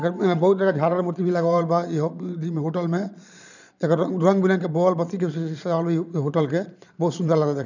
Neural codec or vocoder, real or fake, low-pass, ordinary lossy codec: vocoder, 22.05 kHz, 80 mel bands, Vocos; fake; 7.2 kHz; none